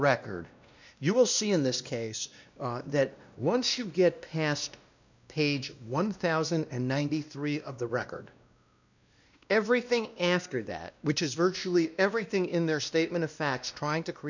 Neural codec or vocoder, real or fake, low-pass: codec, 16 kHz, 1 kbps, X-Codec, WavLM features, trained on Multilingual LibriSpeech; fake; 7.2 kHz